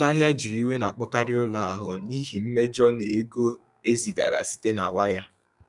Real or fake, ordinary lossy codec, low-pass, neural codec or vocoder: fake; none; 10.8 kHz; codec, 32 kHz, 1.9 kbps, SNAC